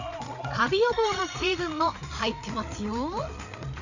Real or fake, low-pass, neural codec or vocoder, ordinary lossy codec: fake; 7.2 kHz; codec, 16 kHz, 8 kbps, FreqCodec, larger model; none